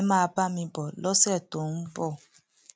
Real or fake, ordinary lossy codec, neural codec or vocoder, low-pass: real; none; none; none